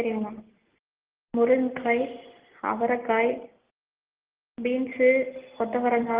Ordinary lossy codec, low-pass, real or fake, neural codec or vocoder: Opus, 16 kbps; 3.6 kHz; real; none